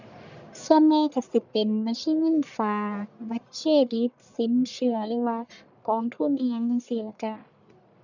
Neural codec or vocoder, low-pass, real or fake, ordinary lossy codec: codec, 44.1 kHz, 1.7 kbps, Pupu-Codec; 7.2 kHz; fake; none